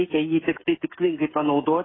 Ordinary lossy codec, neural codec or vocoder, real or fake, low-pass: AAC, 16 kbps; codec, 16 kHz, 16 kbps, FreqCodec, smaller model; fake; 7.2 kHz